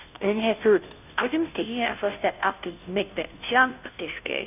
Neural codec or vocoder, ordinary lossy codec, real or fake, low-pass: codec, 16 kHz, 0.5 kbps, FunCodec, trained on Chinese and English, 25 frames a second; none; fake; 3.6 kHz